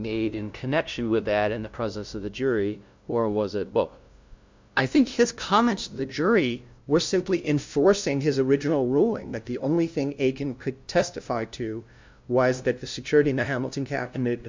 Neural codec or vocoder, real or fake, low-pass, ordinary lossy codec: codec, 16 kHz, 0.5 kbps, FunCodec, trained on LibriTTS, 25 frames a second; fake; 7.2 kHz; MP3, 64 kbps